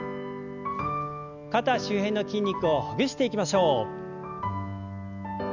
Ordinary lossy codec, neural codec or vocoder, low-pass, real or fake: none; none; 7.2 kHz; real